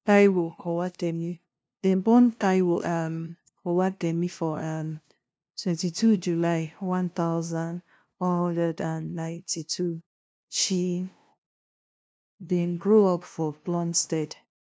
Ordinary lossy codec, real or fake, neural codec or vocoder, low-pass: none; fake; codec, 16 kHz, 0.5 kbps, FunCodec, trained on LibriTTS, 25 frames a second; none